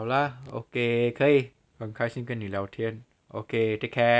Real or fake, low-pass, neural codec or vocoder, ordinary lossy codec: real; none; none; none